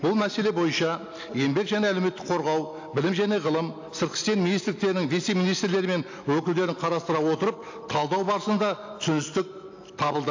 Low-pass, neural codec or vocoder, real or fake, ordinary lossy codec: 7.2 kHz; none; real; AAC, 48 kbps